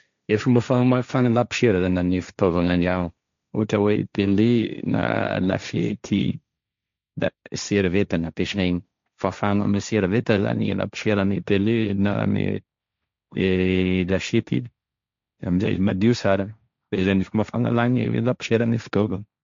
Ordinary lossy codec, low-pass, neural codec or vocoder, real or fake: MP3, 64 kbps; 7.2 kHz; codec, 16 kHz, 1.1 kbps, Voila-Tokenizer; fake